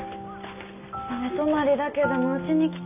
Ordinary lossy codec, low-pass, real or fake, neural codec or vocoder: none; 3.6 kHz; real; none